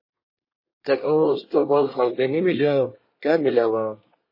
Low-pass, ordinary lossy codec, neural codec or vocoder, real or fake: 5.4 kHz; MP3, 24 kbps; codec, 24 kHz, 1 kbps, SNAC; fake